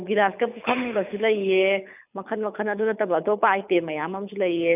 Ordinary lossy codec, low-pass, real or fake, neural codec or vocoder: none; 3.6 kHz; fake; codec, 24 kHz, 6 kbps, HILCodec